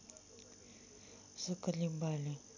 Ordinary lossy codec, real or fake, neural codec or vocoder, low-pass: none; real; none; 7.2 kHz